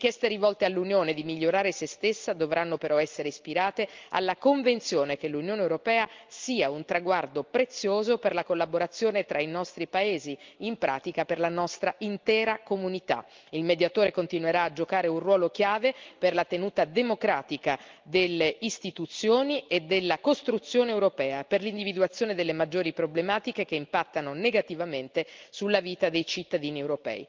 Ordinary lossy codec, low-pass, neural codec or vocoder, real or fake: Opus, 32 kbps; 7.2 kHz; none; real